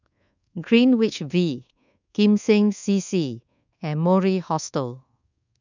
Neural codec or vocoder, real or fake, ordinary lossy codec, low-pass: codec, 24 kHz, 1.2 kbps, DualCodec; fake; none; 7.2 kHz